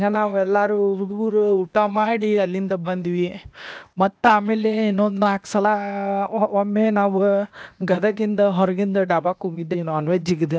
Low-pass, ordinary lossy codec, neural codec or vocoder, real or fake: none; none; codec, 16 kHz, 0.8 kbps, ZipCodec; fake